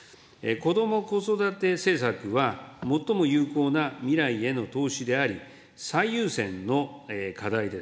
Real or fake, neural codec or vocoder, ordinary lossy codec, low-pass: real; none; none; none